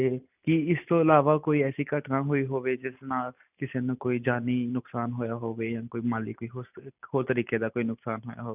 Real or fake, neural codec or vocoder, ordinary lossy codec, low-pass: real; none; none; 3.6 kHz